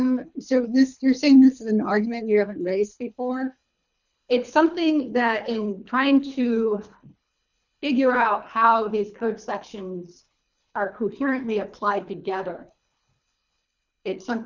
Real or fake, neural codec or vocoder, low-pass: fake; codec, 24 kHz, 3 kbps, HILCodec; 7.2 kHz